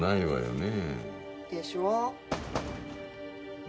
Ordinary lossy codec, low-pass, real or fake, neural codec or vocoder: none; none; real; none